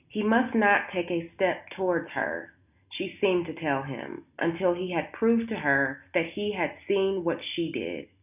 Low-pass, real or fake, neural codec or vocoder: 3.6 kHz; real; none